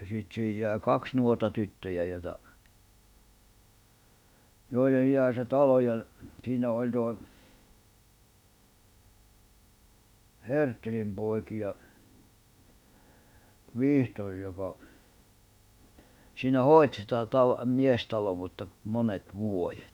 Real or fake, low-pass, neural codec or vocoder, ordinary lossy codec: fake; 19.8 kHz; autoencoder, 48 kHz, 32 numbers a frame, DAC-VAE, trained on Japanese speech; none